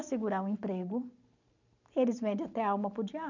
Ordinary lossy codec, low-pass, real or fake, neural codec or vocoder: none; 7.2 kHz; real; none